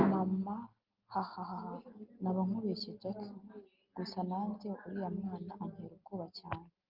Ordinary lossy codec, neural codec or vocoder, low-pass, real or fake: Opus, 24 kbps; none; 5.4 kHz; real